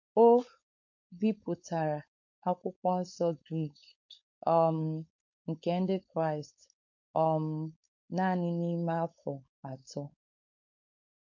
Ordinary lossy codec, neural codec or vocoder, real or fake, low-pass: MP3, 48 kbps; codec, 16 kHz, 4.8 kbps, FACodec; fake; 7.2 kHz